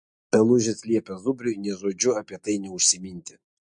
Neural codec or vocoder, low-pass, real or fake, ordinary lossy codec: none; 10.8 kHz; real; MP3, 48 kbps